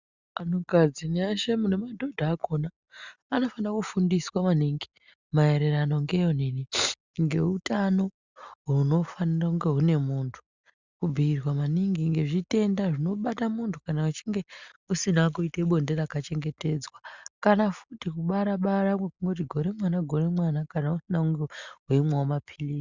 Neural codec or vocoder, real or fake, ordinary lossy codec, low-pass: none; real; Opus, 64 kbps; 7.2 kHz